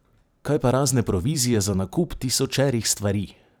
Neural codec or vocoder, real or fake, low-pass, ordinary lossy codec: vocoder, 44.1 kHz, 128 mel bands every 512 samples, BigVGAN v2; fake; none; none